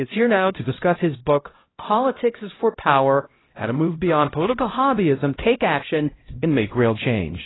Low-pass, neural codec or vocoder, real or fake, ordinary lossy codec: 7.2 kHz; codec, 16 kHz, 0.5 kbps, X-Codec, HuBERT features, trained on LibriSpeech; fake; AAC, 16 kbps